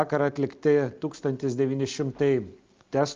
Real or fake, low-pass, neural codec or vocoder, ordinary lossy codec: real; 7.2 kHz; none; Opus, 24 kbps